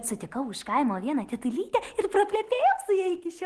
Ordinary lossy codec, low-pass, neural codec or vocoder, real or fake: Opus, 16 kbps; 10.8 kHz; none; real